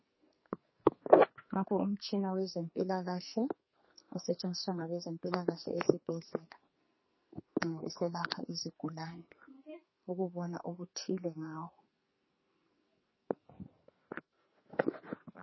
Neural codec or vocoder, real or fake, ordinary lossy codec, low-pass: codec, 44.1 kHz, 2.6 kbps, SNAC; fake; MP3, 24 kbps; 7.2 kHz